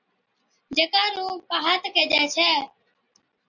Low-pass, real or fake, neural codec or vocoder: 7.2 kHz; real; none